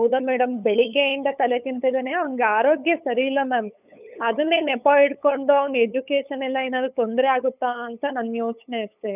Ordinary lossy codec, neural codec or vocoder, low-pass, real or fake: none; codec, 16 kHz, 8 kbps, FunCodec, trained on LibriTTS, 25 frames a second; 3.6 kHz; fake